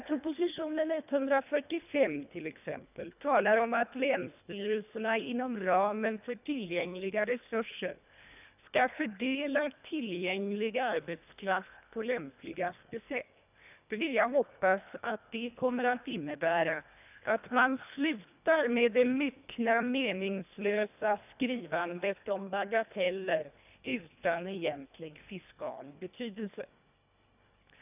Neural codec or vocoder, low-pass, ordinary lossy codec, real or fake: codec, 24 kHz, 1.5 kbps, HILCodec; 3.6 kHz; none; fake